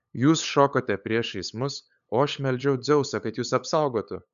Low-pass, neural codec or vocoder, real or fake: 7.2 kHz; codec, 16 kHz, 8 kbps, FunCodec, trained on LibriTTS, 25 frames a second; fake